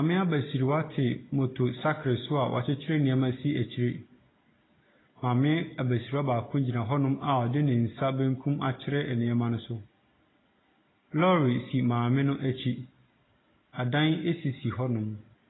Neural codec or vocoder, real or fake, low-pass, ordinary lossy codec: none; real; 7.2 kHz; AAC, 16 kbps